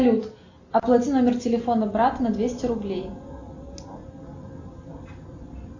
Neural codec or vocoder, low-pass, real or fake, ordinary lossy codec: none; 7.2 kHz; real; AAC, 48 kbps